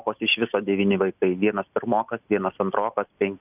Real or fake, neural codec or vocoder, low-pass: real; none; 3.6 kHz